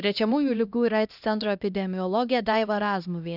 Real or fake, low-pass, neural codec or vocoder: fake; 5.4 kHz; codec, 16 kHz, 1 kbps, X-Codec, HuBERT features, trained on LibriSpeech